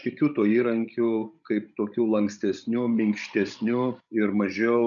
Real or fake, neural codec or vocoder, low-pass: fake; codec, 16 kHz, 16 kbps, FreqCodec, larger model; 7.2 kHz